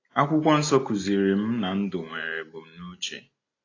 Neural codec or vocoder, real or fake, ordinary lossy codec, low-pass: none; real; AAC, 32 kbps; 7.2 kHz